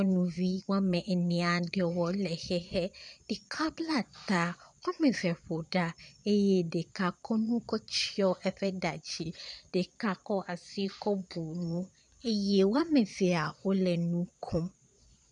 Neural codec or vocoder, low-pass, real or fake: vocoder, 22.05 kHz, 80 mel bands, Vocos; 9.9 kHz; fake